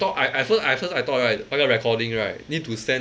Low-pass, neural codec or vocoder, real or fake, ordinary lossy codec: none; none; real; none